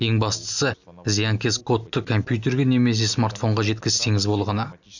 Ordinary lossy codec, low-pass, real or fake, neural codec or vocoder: none; 7.2 kHz; real; none